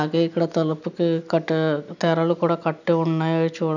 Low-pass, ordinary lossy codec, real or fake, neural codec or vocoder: 7.2 kHz; none; real; none